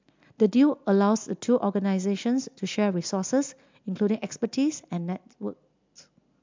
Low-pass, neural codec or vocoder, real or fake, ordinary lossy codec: 7.2 kHz; none; real; MP3, 64 kbps